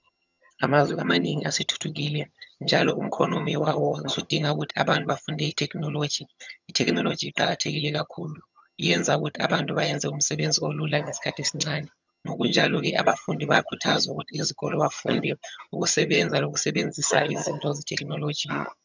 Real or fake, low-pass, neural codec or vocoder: fake; 7.2 kHz; vocoder, 22.05 kHz, 80 mel bands, HiFi-GAN